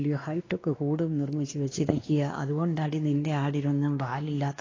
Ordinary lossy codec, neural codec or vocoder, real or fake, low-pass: AAC, 32 kbps; codec, 16 kHz, 2 kbps, X-Codec, WavLM features, trained on Multilingual LibriSpeech; fake; 7.2 kHz